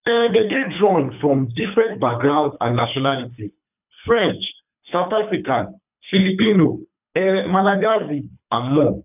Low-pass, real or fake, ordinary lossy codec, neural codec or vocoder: 3.6 kHz; fake; none; codec, 24 kHz, 3 kbps, HILCodec